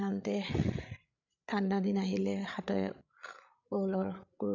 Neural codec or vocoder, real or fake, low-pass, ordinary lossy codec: codec, 16 kHz, 16 kbps, FreqCodec, larger model; fake; 7.2 kHz; none